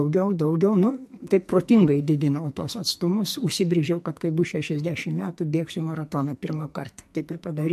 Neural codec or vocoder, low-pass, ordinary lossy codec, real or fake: codec, 44.1 kHz, 2.6 kbps, SNAC; 14.4 kHz; MP3, 64 kbps; fake